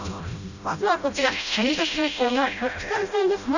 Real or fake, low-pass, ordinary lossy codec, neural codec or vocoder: fake; 7.2 kHz; none; codec, 16 kHz, 0.5 kbps, FreqCodec, smaller model